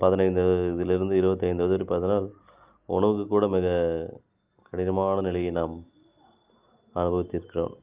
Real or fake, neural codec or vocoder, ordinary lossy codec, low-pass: real; none; Opus, 24 kbps; 3.6 kHz